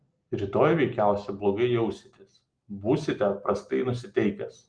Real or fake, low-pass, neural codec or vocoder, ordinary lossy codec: real; 9.9 kHz; none; Opus, 24 kbps